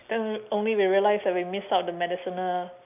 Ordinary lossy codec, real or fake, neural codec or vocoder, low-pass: none; real; none; 3.6 kHz